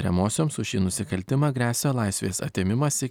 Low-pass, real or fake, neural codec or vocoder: 19.8 kHz; real; none